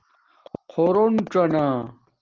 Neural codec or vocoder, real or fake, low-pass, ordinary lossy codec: none; real; 7.2 kHz; Opus, 16 kbps